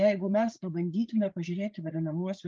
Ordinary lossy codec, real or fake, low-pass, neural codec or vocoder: Opus, 24 kbps; fake; 7.2 kHz; codec, 16 kHz, 8 kbps, FreqCodec, larger model